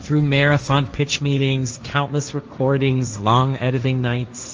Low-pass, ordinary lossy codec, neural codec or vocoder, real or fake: 7.2 kHz; Opus, 24 kbps; codec, 16 kHz, 1.1 kbps, Voila-Tokenizer; fake